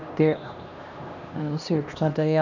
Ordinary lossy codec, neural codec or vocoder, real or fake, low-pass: none; codec, 16 kHz, 1 kbps, X-Codec, HuBERT features, trained on LibriSpeech; fake; 7.2 kHz